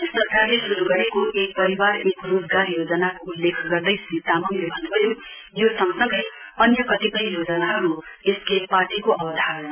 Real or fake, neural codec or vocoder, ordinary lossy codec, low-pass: real; none; none; 3.6 kHz